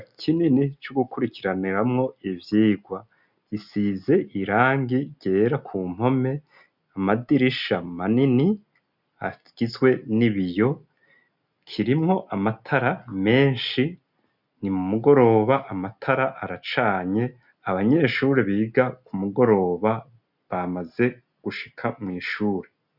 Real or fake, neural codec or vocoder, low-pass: real; none; 5.4 kHz